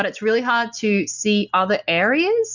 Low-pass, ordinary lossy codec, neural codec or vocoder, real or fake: 7.2 kHz; Opus, 64 kbps; none; real